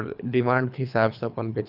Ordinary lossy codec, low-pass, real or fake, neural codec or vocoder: none; 5.4 kHz; fake; codec, 24 kHz, 3 kbps, HILCodec